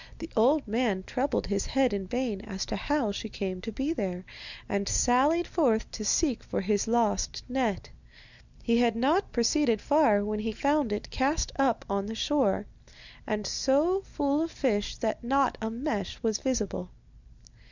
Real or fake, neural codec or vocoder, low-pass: real; none; 7.2 kHz